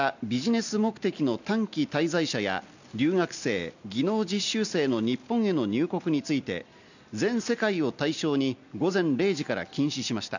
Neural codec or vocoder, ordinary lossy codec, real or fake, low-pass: none; none; real; 7.2 kHz